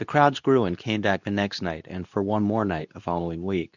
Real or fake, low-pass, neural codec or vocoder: fake; 7.2 kHz; codec, 24 kHz, 0.9 kbps, WavTokenizer, medium speech release version 2